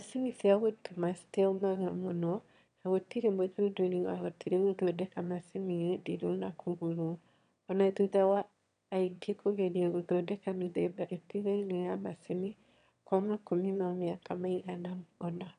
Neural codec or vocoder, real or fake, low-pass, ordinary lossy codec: autoencoder, 22.05 kHz, a latent of 192 numbers a frame, VITS, trained on one speaker; fake; 9.9 kHz; none